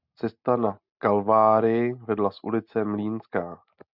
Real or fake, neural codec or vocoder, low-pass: real; none; 5.4 kHz